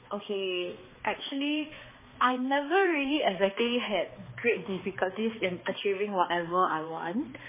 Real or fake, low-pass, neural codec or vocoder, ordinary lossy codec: fake; 3.6 kHz; codec, 16 kHz, 2 kbps, X-Codec, HuBERT features, trained on balanced general audio; MP3, 16 kbps